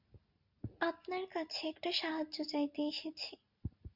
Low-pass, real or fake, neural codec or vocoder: 5.4 kHz; real; none